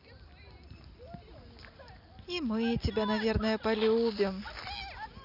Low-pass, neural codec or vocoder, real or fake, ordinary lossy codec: 5.4 kHz; none; real; none